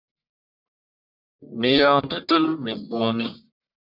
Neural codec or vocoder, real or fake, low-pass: codec, 44.1 kHz, 1.7 kbps, Pupu-Codec; fake; 5.4 kHz